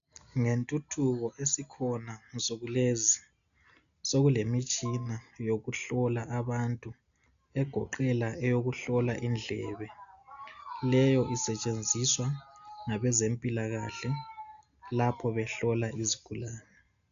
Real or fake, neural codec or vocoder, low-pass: real; none; 7.2 kHz